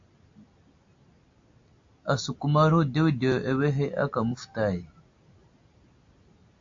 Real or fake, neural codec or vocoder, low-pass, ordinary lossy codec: real; none; 7.2 kHz; AAC, 48 kbps